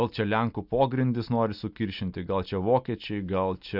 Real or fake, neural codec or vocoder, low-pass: real; none; 5.4 kHz